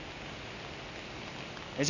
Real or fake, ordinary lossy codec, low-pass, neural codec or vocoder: real; none; 7.2 kHz; none